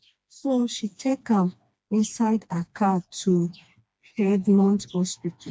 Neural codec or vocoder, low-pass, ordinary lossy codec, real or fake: codec, 16 kHz, 2 kbps, FreqCodec, smaller model; none; none; fake